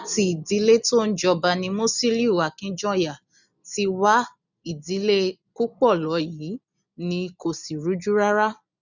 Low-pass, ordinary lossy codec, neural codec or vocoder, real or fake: 7.2 kHz; none; none; real